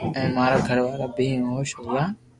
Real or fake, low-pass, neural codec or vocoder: real; 10.8 kHz; none